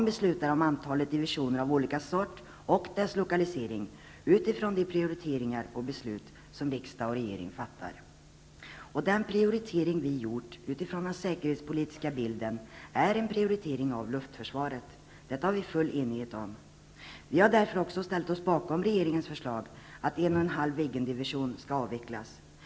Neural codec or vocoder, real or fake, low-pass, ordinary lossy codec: none; real; none; none